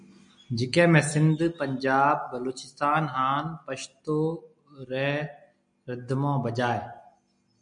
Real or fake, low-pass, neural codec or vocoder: real; 9.9 kHz; none